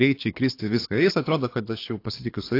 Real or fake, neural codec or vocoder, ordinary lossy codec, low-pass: fake; codec, 24 kHz, 6 kbps, HILCodec; AAC, 32 kbps; 5.4 kHz